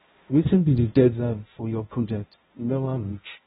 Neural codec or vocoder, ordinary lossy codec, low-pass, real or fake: codec, 16 kHz, 0.5 kbps, X-Codec, HuBERT features, trained on balanced general audio; AAC, 16 kbps; 7.2 kHz; fake